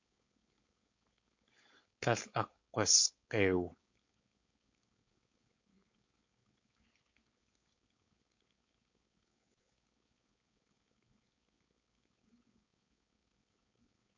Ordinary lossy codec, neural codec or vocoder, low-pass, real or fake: MP3, 48 kbps; codec, 16 kHz, 4.8 kbps, FACodec; 7.2 kHz; fake